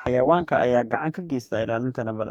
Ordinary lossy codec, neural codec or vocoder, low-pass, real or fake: none; codec, 44.1 kHz, 2.6 kbps, DAC; 19.8 kHz; fake